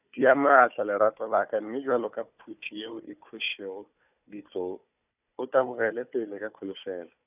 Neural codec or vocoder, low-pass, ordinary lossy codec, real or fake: codec, 16 kHz, 4 kbps, FunCodec, trained on Chinese and English, 50 frames a second; 3.6 kHz; none; fake